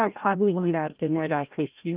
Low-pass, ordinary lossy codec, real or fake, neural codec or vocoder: 3.6 kHz; Opus, 32 kbps; fake; codec, 16 kHz, 0.5 kbps, FreqCodec, larger model